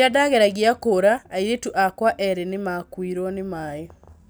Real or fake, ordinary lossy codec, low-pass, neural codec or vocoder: real; none; none; none